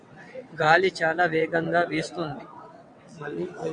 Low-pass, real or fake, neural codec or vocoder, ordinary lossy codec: 9.9 kHz; fake; vocoder, 22.05 kHz, 80 mel bands, WaveNeXt; MP3, 64 kbps